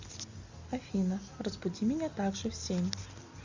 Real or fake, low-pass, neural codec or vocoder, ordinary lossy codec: real; 7.2 kHz; none; Opus, 64 kbps